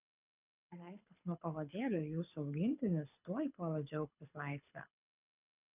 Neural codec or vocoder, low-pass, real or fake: codec, 24 kHz, 6 kbps, HILCodec; 3.6 kHz; fake